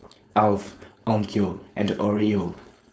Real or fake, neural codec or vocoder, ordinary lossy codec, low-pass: fake; codec, 16 kHz, 4.8 kbps, FACodec; none; none